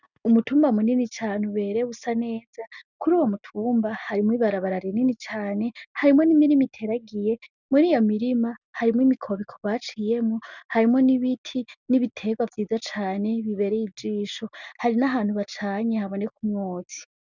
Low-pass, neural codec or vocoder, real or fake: 7.2 kHz; none; real